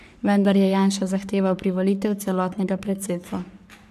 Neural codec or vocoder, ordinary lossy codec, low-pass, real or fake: codec, 44.1 kHz, 3.4 kbps, Pupu-Codec; none; 14.4 kHz; fake